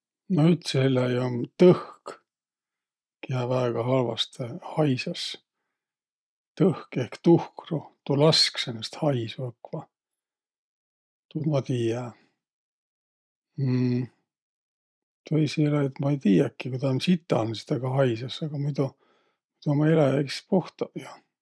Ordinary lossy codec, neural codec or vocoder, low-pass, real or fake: none; none; none; real